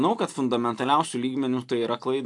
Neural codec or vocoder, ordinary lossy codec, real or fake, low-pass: vocoder, 44.1 kHz, 128 mel bands every 256 samples, BigVGAN v2; AAC, 64 kbps; fake; 10.8 kHz